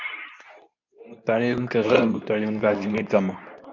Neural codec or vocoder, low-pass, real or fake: codec, 24 kHz, 0.9 kbps, WavTokenizer, medium speech release version 2; 7.2 kHz; fake